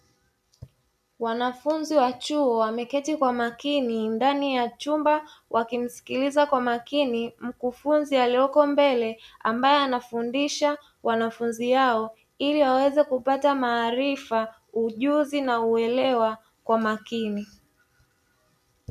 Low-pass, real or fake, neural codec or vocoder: 14.4 kHz; real; none